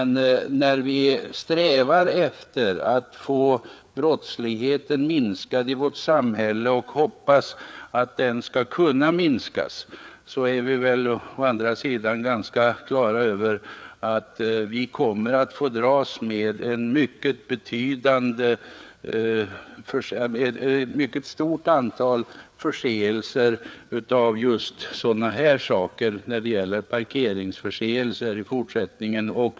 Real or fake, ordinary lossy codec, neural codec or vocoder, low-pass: fake; none; codec, 16 kHz, 4 kbps, FreqCodec, larger model; none